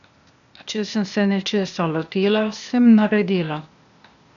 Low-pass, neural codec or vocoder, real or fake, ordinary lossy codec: 7.2 kHz; codec, 16 kHz, 0.8 kbps, ZipCodec; fake; none